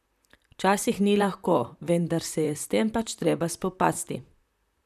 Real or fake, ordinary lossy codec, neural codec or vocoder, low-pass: fake; none; vocoder, 44.1 kHz, 128 mel bands, Pupu-Vocoder; 14.4 kHz